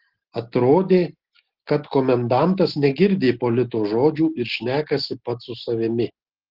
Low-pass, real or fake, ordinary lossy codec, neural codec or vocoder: 5.4 kHz; real; Opus, 16 kbps; none